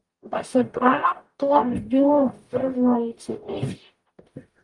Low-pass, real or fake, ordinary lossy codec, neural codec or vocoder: 10.8 kHz; fake; Opus, 32 kbps; codec, 44.1 kHz, 0.9 kbps, DAC